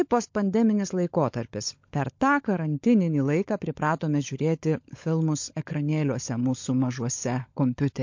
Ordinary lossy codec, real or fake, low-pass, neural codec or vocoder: MP3, 48 kbps; fake; 7.2 kHz; codec, 16 kHz, 16 kbps, FunCodec, trained on LibriTTS, 50 frames a second